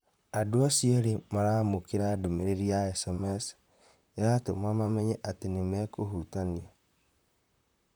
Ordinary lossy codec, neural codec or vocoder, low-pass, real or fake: none; vocoder, 44.1 kHz, 128 mel bands, Pupu-Vocoder; none; fake